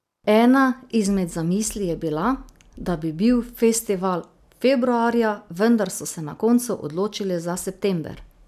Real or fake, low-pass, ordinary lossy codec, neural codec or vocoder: real; 14.4 kHz; none; none